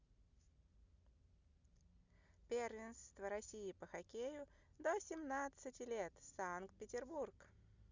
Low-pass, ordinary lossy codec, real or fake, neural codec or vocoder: 7.2 kHz; Opus, 64 kbps; real; none